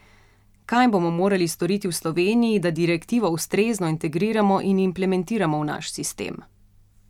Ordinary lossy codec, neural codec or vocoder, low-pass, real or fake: none; none; 19.8 kHz; real